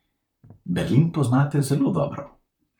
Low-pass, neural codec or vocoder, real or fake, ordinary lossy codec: 19.8 kHz; vocoder, 44.1 kHz, 128 mel bands, Pupu-Vocoder; fake; none